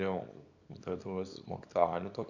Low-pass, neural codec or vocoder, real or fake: 7.2 kHz; codec, 24 kHz, 0.9 kbps, WavTokenizer, small release; fake